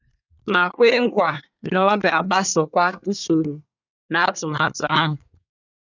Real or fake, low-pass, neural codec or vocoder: fake; 7.2 kHz; codec, 24 kHz, 1 kbps, SNAC